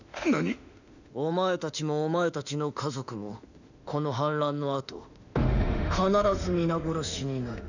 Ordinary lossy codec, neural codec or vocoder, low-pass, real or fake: none; autoencoder, 48 kHz, 32 numbers a frame, DAC-VAE, trained on Japanese speech; 7.2 kHz; fake